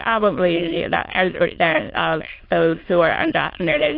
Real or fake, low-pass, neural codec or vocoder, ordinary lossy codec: fake; 5.4 kHz; autoencoder, 22.05 kHz, a latent of 192 numbers a frame, VITS, trained on many speakers; AAC, 32 kbps